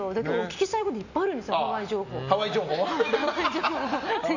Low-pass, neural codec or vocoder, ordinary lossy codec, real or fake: 7.2 kHz; none; none; real